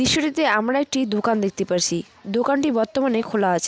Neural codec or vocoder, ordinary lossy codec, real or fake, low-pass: none; none; real; none